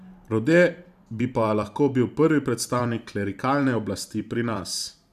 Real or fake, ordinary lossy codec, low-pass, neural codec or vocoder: fake; none; 14.4 kHz; vocoder, 44.1 kHz, 128 mel bands every 512 samples, BigVGAN v2